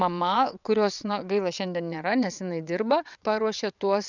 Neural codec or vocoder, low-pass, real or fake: vocoder, 22.05 kHz, 80 mel bands, WaveNeXt; 7.2 kHz; fake